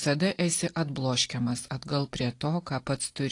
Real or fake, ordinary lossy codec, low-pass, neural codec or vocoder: real; AAC, 48 kbps; 10.8 kHz; none